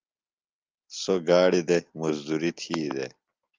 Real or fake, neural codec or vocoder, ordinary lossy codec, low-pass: real; none; Opus, 24 kbps; 7.2 kHz